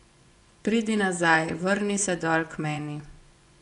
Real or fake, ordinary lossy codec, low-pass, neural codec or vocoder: fake; none; 10.8 kHz; vocoder, 24 kHz, 100 mel bands, Vocos